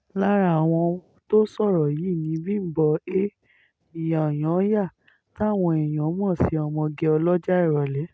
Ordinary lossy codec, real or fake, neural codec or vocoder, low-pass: none; real; none; none